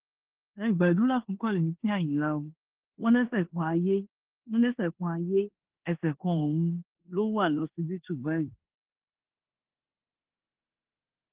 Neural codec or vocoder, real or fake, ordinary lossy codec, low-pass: codec, 16 kHz in and 24 kHz out, 0.9 kbps, LongCat-Audio-Codec, four codebook decoder; fake; Opus, 16 kbps; 3.6 kHz